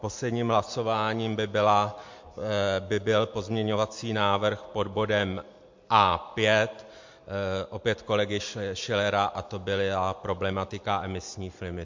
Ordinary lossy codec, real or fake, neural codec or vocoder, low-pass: MP3, 48 kbps; real; none; 7.2 kHz